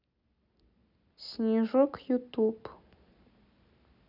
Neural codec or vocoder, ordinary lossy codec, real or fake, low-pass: none; none; real; 5.4 kHz